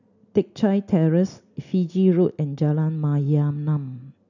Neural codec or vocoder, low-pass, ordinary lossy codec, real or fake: none; 7.2 kHz; none; real